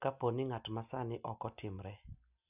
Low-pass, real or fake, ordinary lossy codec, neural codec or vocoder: 3.6 kHz; real; none; none